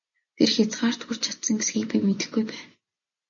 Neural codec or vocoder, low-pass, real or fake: none; 7.2 kHz; real